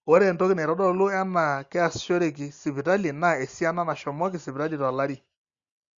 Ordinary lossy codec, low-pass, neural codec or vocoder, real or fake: Opus, 64 kbps; 7.2 kHz; none; real